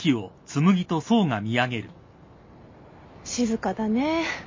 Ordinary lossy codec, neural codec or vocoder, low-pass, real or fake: MP3, 32 kbps; none; 7.2 kHz; real